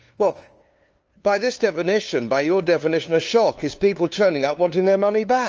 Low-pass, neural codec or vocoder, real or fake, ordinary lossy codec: 7.2 kHz; codec, 16 kHz, 2 kbps, FunCodec, trained on LibriTTS, 25 frames a second; fake; Opus, 24 kbps